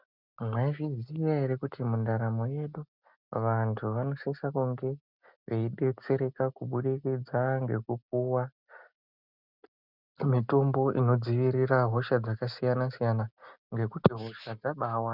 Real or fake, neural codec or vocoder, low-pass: real; none; 5.4 kHz